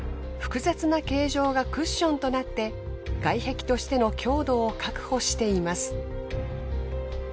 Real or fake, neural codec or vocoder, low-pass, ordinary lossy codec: real; none; none; none